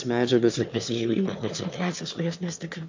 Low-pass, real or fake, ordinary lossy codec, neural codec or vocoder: 7.2 kHz; fake; MP3, 48 kbps; autoencoder, 22.05 kHz, a latent of 192 numbers a frame, VITS, trained on one speaker